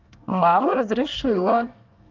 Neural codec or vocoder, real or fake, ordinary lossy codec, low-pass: codec, 24 kHz, 1 kbps, SNAC; fake; Opus, 24 kbps; 7.2 kHz